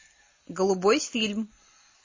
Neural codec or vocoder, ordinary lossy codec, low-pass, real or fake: none; MP3, 32 kbps; 7.2 kHz; real